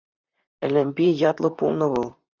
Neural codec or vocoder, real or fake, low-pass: vocoder, 44.1 kHz, 128 mel bands, Pupu-Vocoder; fake; 7.2 kHz